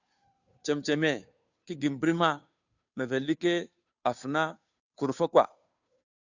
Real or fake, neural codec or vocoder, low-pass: fake; codec, 16 kHz, 2 kbps, FunCodec, trained on Chinese and English, 25 frames a second; 7.2 kHz